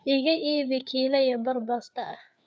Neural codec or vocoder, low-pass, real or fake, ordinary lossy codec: codec, 16 kHz, 4 kbps, FreqCodec, larger model; none; fake; none